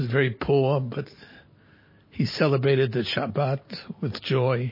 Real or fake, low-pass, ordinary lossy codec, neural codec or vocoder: real; 5.4 kHz; MP3, 24 kbps; none